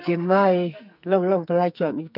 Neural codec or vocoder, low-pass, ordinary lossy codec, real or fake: codec, 44.1 kHz, 2.6 kbps, SNAC; 5.4 kHz; none; fake